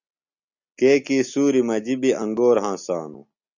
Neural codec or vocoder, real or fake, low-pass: none; real; 7.2 kHz